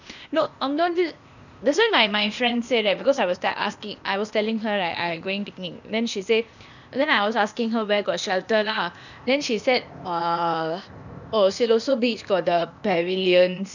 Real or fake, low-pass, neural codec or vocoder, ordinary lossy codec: fake; 7.2 kHz; codec, 16 kHz, 0.8 kbps, ZipCodec; none